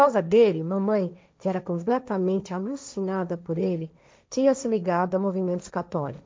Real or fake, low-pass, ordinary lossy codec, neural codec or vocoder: fake; none; none; codec, 16 kHz, 1.1 kbps, Voila-Tokenizer